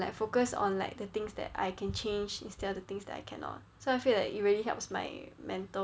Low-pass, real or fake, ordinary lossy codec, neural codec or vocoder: none; real; none; none